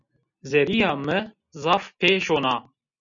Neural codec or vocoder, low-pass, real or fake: none; 7.2 kHz; real